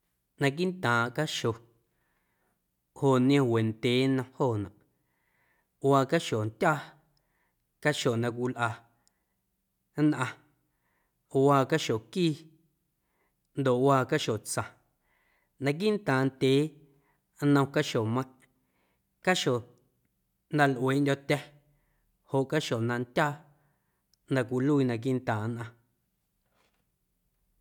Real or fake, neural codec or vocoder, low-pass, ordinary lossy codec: real; none; 19.8 kHz; none